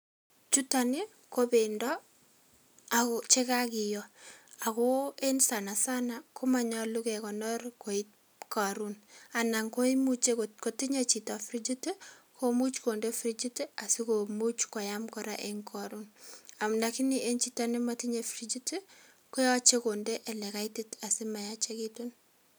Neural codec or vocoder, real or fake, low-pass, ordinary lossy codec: none; real; none; none